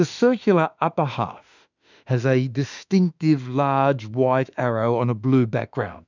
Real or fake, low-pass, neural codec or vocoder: fake; 7.2 kHz; autoencoder, 48 kHz, 32 numbers a frame, DAC-VAE, trained on Japanese speech